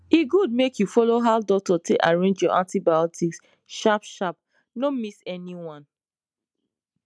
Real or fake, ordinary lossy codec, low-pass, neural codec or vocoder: real; none; none; none